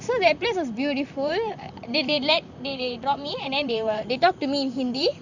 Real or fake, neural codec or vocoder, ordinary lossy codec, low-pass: fake; vocoder, 44.1 kHz, 128 mel bands, Pupu-Vocoder; none; 7.2 kHz